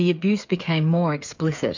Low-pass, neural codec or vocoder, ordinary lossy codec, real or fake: 7.2 kHz; codec, 16 kHz, 8 kbps, FreqCodec, smaller model; MP3, 64 kbps; fake